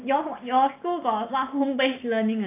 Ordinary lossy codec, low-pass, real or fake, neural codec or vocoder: AAC, 24 kbps; 3.6 kHz; real; none